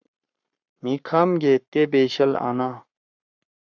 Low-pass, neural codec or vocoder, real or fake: 7.2 kHz; codec, 44.1 kHz, 7.8 kbps, Pupu-Codec; fake